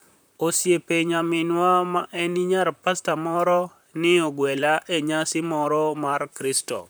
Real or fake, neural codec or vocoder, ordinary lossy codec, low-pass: fake; vocoder, 44.1 kHz, 128 mel bands, Pupu-Vocoder; none; none